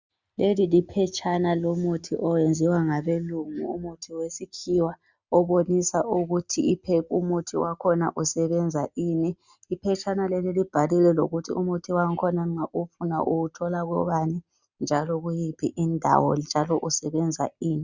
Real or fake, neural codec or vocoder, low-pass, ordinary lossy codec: real; none; 7.2 kHz; Opus, 64 kbps